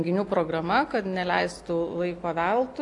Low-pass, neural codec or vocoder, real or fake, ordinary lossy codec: 10.8 kHz; none; real; AAC, 48 kbps